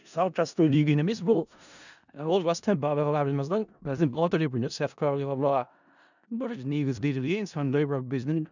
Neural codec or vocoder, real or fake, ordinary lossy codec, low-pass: codec, 16 kHz in and 24 kHz out, 0.4 kbps, LongCat-Audio-Codec, four codebook decoder; fake; none; 7.2 kHz